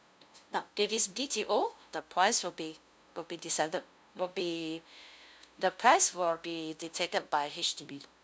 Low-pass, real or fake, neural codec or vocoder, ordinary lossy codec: none; fake; codec, 16 kHz, 0.5 kbps, FunCodec, trained on LibriTTS, 25 frames a second; none